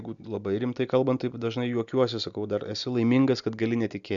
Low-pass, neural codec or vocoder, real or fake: 7.2 kHz; none; real